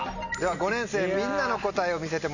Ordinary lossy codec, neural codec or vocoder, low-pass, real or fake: none; none; 7.2 kHz; real